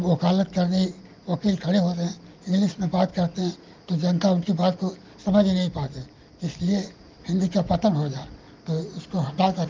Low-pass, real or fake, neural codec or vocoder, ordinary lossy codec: 7.2 kHz; real; none; Opus, 32 kbps